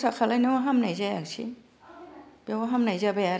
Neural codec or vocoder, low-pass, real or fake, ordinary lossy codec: none; none; real; none